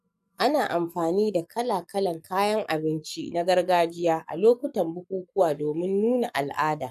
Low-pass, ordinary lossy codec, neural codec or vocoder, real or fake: 14.4 kHz; none; codec, 44.1 kHz, 7.8 kbps, Pupu-Codec; fake